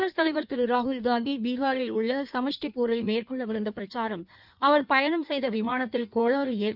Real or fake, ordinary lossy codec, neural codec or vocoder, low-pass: fake; none; codec, 16 kHz in and 24 kHz out, 1.1 kbps, FireRedTTS-2 codec; 5.4 kHz